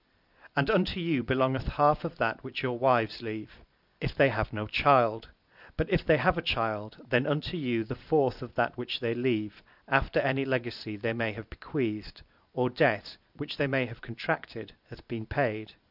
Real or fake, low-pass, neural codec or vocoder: real; 5.4 kHz; none